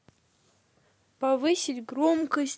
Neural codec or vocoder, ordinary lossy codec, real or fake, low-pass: none; none; real; none